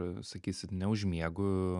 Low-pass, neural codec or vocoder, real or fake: 10.8 kHz; none; real